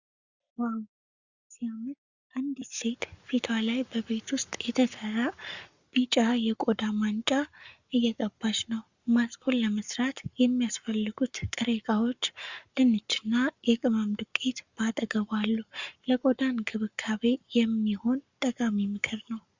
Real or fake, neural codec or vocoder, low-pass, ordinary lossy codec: fake; codec, 44.1 kHz, 7.8 kbps, Pupu-Codec; 7.2 kHz; Opus, 64 kbps